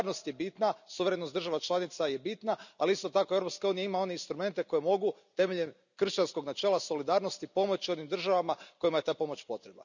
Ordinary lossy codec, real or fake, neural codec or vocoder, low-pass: none; real; none; 7.2 kHz